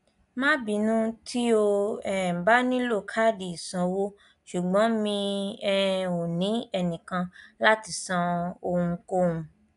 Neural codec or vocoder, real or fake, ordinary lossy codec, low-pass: none; real; none; 10.8 kHz